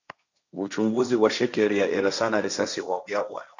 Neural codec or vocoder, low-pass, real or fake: codec, 16 kHz, 1.1 kbps, Voila-Tokenizer; 7.2 kHz; fake